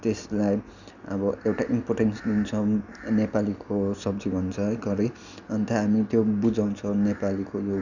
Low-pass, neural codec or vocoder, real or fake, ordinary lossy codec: 7.2 kHz; none; real; none